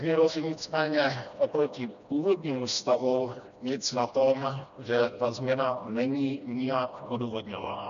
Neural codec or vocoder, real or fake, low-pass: codec, 16 kHz, 1 kbps, FreqCodec, smaller model; fake; 7.2 kHz